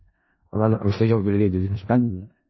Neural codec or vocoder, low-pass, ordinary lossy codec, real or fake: codec, 16 kHz in and 24 kHz out, 0.4 kbps, LongCat-Audio-Codec, four codebook decoder; 7.2 kHz; MP3, 24 kbps; fake